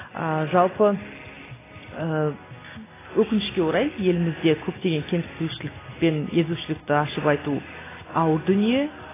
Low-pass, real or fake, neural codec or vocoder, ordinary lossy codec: 3.6 kHz; real; none; AAC, 16 kbps